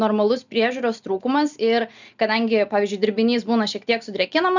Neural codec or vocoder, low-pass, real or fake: none; 7.2 kHz; real